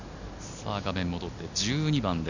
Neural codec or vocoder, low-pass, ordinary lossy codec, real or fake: none; 7.2 kHz; none; real